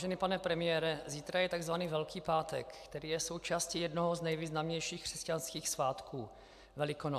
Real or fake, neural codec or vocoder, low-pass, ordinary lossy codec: real; none; 14.4 kHz; AAC, 96 kbps